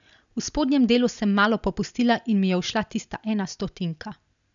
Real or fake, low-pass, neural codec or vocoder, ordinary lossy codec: real; 7.2 kHz; none; none